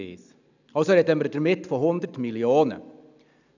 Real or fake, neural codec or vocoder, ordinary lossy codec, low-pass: real; none; none; 7.2 kHz